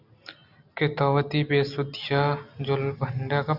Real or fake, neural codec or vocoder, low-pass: real; none; 5.4 kHz